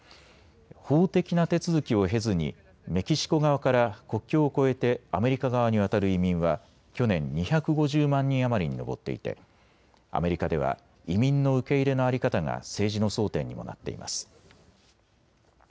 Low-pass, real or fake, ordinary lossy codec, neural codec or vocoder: none; real; none; none